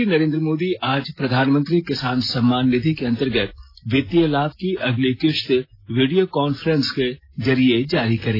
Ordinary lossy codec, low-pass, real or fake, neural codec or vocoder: AAC, 24 kbps; 5.4 kHz; real; none